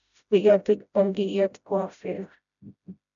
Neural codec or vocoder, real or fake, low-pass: codec, 16 kHz, 0.5 kbps, FreqCodec, smaller model; fake; 7.2 kHz